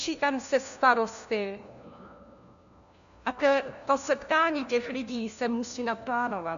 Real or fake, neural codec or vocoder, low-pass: fake; codec, 16 kHz, 1 kbps, FunCodec, trained on LibriTTS, 50 frames a second; 7.2 kHz